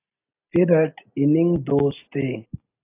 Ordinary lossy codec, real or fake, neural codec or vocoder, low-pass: AAC, 24 kbps; real; none; 3.6 kHz